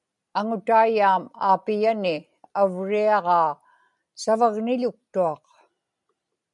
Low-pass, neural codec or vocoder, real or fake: 10.8 kHz; none; real